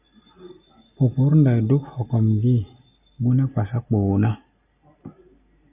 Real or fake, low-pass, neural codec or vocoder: real; 3.6 kHz; none